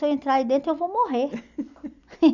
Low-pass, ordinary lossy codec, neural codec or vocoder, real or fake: 7.2 kHz; none; none; real